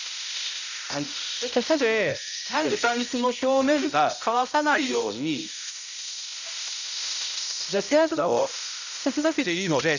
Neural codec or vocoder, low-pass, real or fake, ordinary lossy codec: codec, 16 kHz, 0.5 kbps, X-Codec, HuBERT features, trained on balanced general audio; 7.2 kHz; fake; none